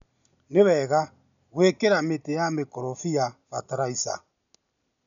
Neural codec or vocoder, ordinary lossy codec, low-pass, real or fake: none; none; 7.2 kHz; real